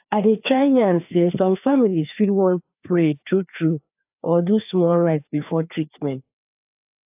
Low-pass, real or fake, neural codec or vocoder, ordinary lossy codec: 3.6 kHz; fake; codec, 16 kHz, 2 kbps, FreqCodec, larger model; AAC, 32 kbps